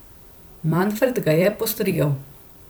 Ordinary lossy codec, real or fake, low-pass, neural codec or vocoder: none; fake; none; vocoder, 44.1 kHz, 128 mel bands every 256 samples, BigVGAN v2